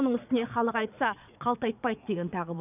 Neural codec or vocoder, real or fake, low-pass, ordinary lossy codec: vocoder, 22.05 kHz, 80 mel bands, Vocos; fake; 3.6 kHz; none